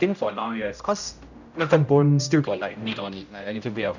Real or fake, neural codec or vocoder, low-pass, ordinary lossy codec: fake; codec, 16 kHz, 0.5 kbps, X-Codec, HuBERT features, trained on general audio; 7.2 kHz; none